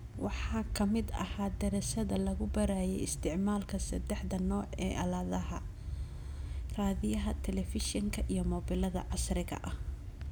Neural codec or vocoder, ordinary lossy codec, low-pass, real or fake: none; none; none; real